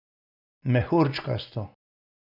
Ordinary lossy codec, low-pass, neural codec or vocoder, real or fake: none; 5.4 kHz; none; real